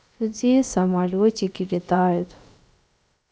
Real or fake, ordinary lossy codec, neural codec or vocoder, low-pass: fake; none; codec, 16 kHz, about 1 kbps, DyCAST, with the encoder's durations; none